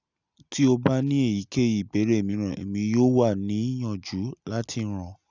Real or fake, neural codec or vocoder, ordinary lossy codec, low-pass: real; none; none; 7.2 kHz